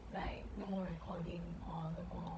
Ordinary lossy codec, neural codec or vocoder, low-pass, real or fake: none; codec, 16 kHz, 16 kbps, FunCodec, trained on Chinese and English, 50 frames a second; none; fake